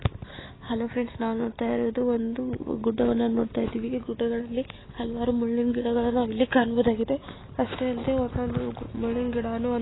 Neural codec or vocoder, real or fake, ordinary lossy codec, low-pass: none; real; AAC, 16 kbps; 7.2 kHz